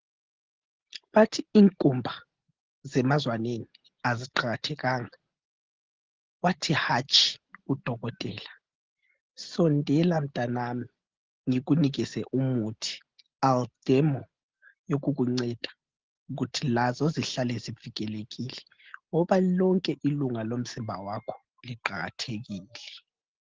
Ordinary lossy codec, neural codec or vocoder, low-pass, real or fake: Opus, 16 kbps; none; 7.2 kHz; real